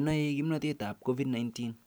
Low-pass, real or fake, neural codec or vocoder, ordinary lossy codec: none; real; none; none